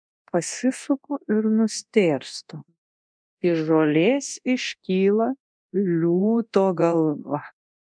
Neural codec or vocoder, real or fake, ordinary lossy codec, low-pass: codec, 24 kHz, 0.9 kbps, DualCodec; fake; MP3, 96 kbps; 9.9 kHz